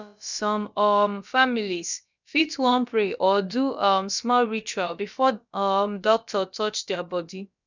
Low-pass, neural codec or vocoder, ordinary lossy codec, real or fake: 7.2 kHz; codec, 16 kHz, about 1 kbps, DyCAST, with the encoder's durations; none; fake